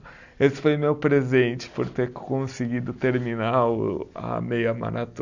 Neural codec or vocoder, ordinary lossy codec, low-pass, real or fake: none; none; 7.2 kHz; real